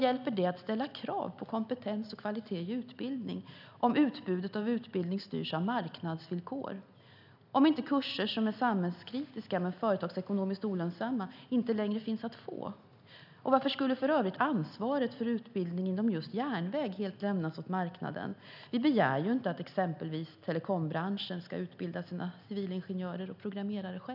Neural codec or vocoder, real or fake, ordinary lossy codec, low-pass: none; real; none; 5.4 kHz